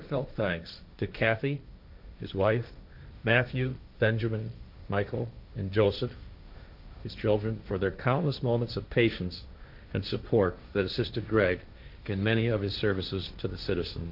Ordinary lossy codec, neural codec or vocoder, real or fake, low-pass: AAC, 48 kbps; codec, 16 kHz, 1.1 kbps, Voila-Tokenizer; fake; 5.4 kHz